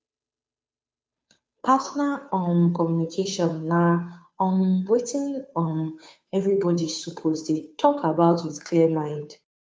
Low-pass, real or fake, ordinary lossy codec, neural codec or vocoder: none; fake; none; codec, 16 kHz, 2 kbps, FunCodec, trained on Chinese and English, 25 frames a second